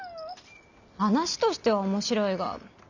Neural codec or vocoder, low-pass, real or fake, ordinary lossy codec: none; 7.2 kHz; real; none